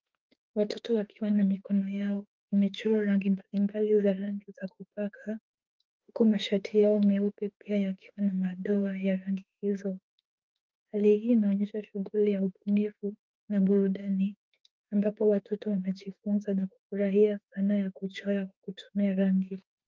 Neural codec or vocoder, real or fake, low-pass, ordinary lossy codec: autoencoder, 48 kHz, 32 numbers a frame, DAC-VAE, trained on Japanese speech; fake; 7.2 kHz; Opus, 32 kbps